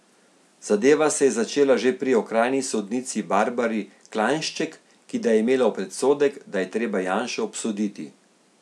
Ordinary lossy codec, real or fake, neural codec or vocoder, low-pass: none; real; none; none